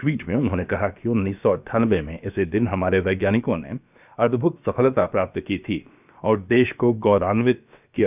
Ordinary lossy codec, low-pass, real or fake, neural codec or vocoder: none; 3.6 kHz; fake; codec, 16 kHz, about 1 kbps, DyCAST, with the encoder's durations